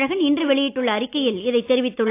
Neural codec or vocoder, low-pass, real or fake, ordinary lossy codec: none; 3.6 kHz; real; AAC, 24 kbps